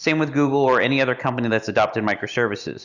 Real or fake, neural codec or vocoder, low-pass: real; none; 7.2 kHz